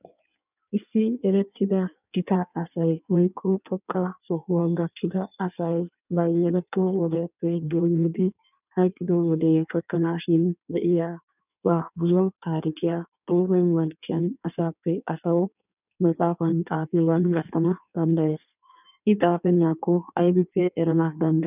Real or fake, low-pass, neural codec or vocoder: fake; 3.6 kHz; codec, 16 kHz in and 24 kHz out, 1.1 kbps, FireRedTTS-2 codec